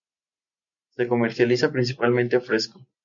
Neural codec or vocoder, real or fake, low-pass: none; real; 7.2 kHz